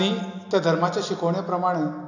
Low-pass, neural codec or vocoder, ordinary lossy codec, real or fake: 7.2 kHz; none; none; real